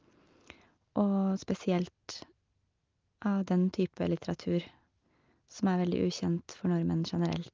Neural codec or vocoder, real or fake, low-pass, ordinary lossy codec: none; real; 7.2 kHz; Opus, 32 kbps